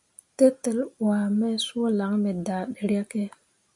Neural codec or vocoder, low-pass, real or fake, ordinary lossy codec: none; 10.8 kHz; real; MP3, 64 kbps